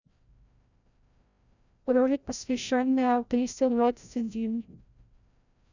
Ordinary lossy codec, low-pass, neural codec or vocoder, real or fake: none; 7.2 kHz; codec, 16 kHz, 0.5 kbps, FreqCodec, larger model; fake